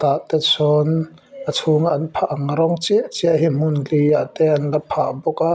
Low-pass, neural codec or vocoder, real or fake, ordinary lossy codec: none; none; real; none